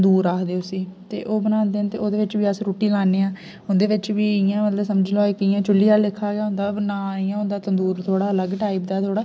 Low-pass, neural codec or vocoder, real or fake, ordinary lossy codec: none; none; real; none